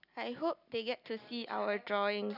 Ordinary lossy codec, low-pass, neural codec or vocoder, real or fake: none; 5.4 kHz; none; real